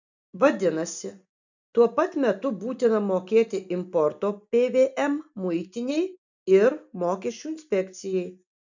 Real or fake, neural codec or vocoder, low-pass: real; none; 7.2 kHz